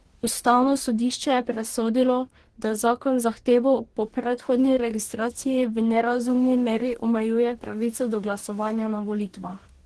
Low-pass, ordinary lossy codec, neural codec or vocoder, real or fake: 10.8 kHz; Opus, 16 kbps; codec, 44.1 kHz, 2.6 kbps, DAC; fake